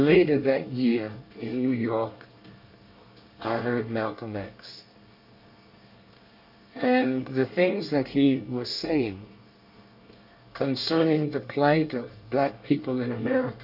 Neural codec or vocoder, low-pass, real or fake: codec, 24 kHz, 1 kbps, SNAC; 5.4 kHz; fake